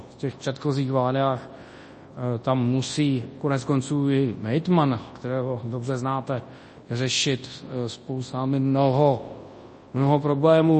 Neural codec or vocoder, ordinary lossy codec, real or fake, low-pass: codec, 24 kHz, 0.9 kbps, WavTokenizer, large speech release; MP3, 32 kbps; fake; 10.8 kHz